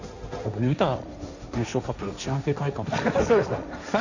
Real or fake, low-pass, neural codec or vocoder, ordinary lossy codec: fake; 7.2 kHz; codec, 16 kHz, 1.1 kbps, Voila-Tokenizer; none